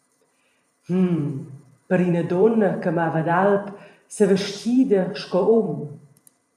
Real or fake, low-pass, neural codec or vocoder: fake; 14.4 kHz; vocoder, 44.1 kHz, 128 mel bands every 512 samples, BigVGAN v2